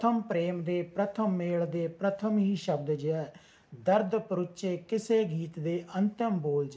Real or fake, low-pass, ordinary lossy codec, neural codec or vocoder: real; none; none; none